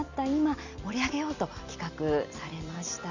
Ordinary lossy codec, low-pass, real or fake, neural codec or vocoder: none; 7.2 kHz; real; none